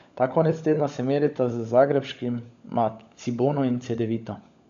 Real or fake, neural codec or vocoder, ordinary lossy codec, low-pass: fake; codec, 16 kHz, 16 kbps, FunCodec, trained on LibriTTS, 50 frames a second; MP3, 64 kbps; 7.2 kHz